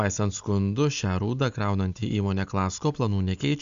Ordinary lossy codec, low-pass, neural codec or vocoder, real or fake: Opus, 64 kbps; 7.2 kHz; none; real